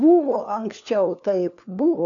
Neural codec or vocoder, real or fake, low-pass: codec, 16 kHz, 4 kbps, FunCodec, trained on LibriTTS, 50 frames a second; fake; 7.2 kHz